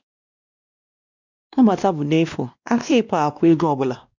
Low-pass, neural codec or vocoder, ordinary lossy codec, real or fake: 7.2 kHz; codec, 16 kHz, 1 kbps, X-Codec, WavLM features, trained on Multilingual LibriSpeech; none; fake